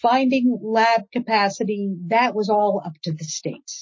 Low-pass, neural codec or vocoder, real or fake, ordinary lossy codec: 7.2 kHz; none; real; MP3, 32 kbps